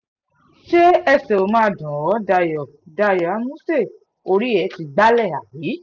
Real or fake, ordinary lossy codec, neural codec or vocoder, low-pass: real; none; none; 7.2 kHz